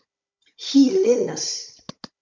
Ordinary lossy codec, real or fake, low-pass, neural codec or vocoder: MP3, 64 kbps; fake; 7.2 kHz; codec, 16 kHz, 16 kbps, FunCodec, trained on Chinese and English, 50 frames a second